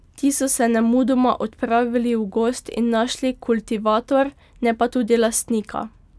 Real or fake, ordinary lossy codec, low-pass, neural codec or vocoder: real; none; none; none